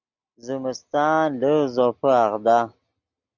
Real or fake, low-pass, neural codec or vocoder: real; 7.2 kHz; none